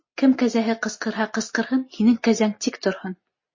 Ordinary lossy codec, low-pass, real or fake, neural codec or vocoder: MP3, 32 kbps; 7.2 kHz; real; none